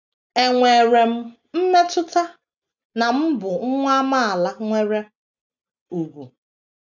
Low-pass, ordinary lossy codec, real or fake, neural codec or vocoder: 7.2 kHz; none; real; none